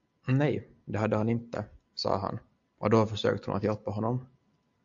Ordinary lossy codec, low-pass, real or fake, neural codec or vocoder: AAC, 64 kbps; 7.2 kHz; real; none